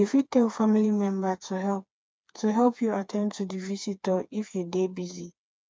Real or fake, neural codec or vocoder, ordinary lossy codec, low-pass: fake; codec, 16 kHz, 4 kbps, FreqCodec, smaller model; none; none